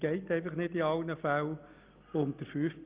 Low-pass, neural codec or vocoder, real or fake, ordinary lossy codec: 3.6 kHz; none; real; Opus, 24 kbps